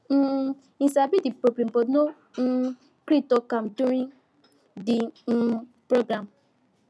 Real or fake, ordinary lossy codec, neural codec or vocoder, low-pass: real; none; none; none